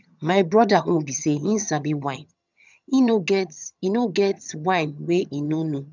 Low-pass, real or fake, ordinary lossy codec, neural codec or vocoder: 7.2 kHz; fake; none; vocoder, 22.05 kHz, 80 mel bands, HiFi-GAN